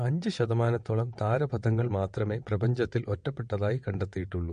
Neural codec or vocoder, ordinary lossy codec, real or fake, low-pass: vocoder, 22.05 kHz, 80 mel bands, WaveNeXt; MP3, 48 kbps; fake; 9.9 kHz